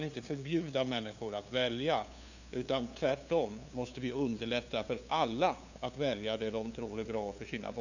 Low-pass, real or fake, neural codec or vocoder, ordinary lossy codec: 7.2 kHz; fake; codec, 16 kHz, 2 kbps, FunCodec, trained on LibriTTS, 25 frames a second; none